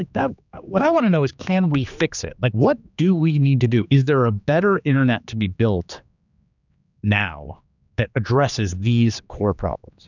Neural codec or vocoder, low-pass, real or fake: codec, 16 kHz, 2 kbps, X-Codec, HuBERT features, trained on general audio; 7.2 kHz; fake